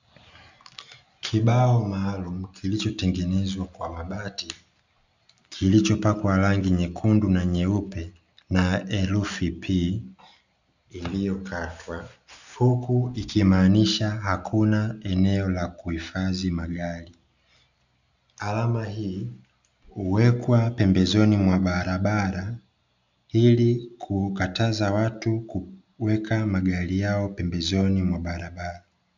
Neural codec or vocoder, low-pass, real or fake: none; 7.2 kHz; real